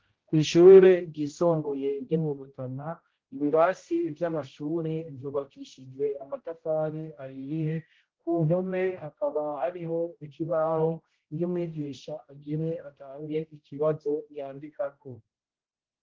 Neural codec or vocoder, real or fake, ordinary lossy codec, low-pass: codec, 16 kHz, 0.5 kbps, X-Codec, HuBERT features, trained on general audio; fake; Opus, 16 kbps; 7.2 kHz